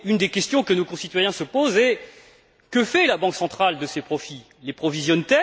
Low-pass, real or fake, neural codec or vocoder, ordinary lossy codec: none; real; none; none